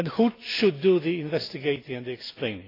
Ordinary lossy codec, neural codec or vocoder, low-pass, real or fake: AAC, 24 kbps; none; 5.4 kHz; real